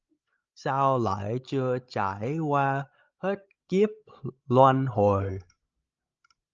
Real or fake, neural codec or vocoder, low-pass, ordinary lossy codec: fake; codec, 16 kHz, 16 kbps, FreqCodec, larger model; 7.2 kHz; Opus, 24 kbps